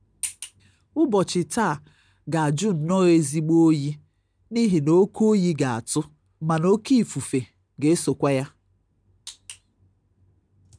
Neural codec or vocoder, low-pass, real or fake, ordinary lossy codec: none; 9.9 kHz; real; none